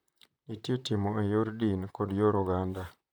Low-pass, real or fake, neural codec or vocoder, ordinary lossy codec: none; fake; vocoder, 44.1 kHz, 128 mel bands, Pupu-Vocoder; none